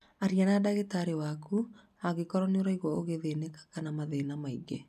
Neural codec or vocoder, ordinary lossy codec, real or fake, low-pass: none; none; real; 14.4 kHz